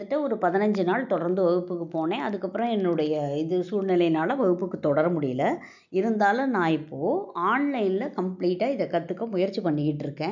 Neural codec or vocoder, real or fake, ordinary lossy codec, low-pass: none; real; none; 7.2 kHz